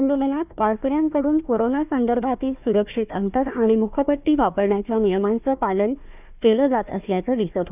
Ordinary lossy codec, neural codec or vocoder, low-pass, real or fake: none; codec, 16 kHz, 1 kbps, FunCodec, trained on Chinese and English, 50 frames a second; 3.6 kHz; fake